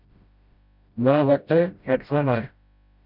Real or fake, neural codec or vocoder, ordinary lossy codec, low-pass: fake; codec, 16 kHz, 0.5 kbps, FreqCodec, smaller model; Opus, 64 kbps; 5.4 kHz